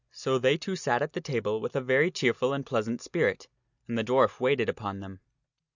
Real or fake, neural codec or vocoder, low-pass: real; none; 7.2 kHz